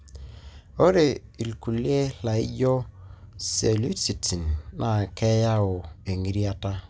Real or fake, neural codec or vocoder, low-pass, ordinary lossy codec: real; none; none; none